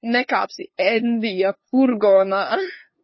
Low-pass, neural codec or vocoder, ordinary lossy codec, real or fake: 7.2 kHz; codec, 16 kHz, 2 kbps, FunCodec, trained on LibriTTS, 25 frames a second; MP3, 24 kbps; fake